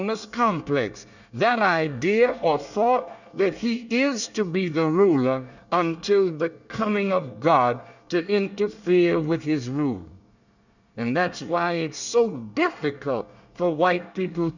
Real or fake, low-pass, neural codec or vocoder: fake; 7.2 kHz; codec, 24 kHz, 1 kbps, SNAC